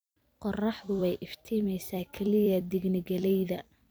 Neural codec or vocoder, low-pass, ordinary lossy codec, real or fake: vocoder, 44.1 kHz, 128 mel bands every 256 samples, BigVGAN v2; none; none; fake